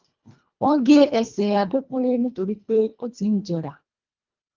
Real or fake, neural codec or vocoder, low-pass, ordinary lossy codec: fake; codec, 24 kHz, 1.5 kbps, HILCodec; 7.2 kHz; Opus, 24 kbps